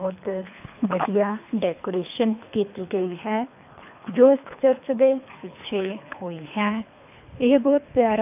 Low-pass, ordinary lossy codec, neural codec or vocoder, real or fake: 3.6 kHz; none; codec, 24 kHz, 3 kbps, HILCodec; fake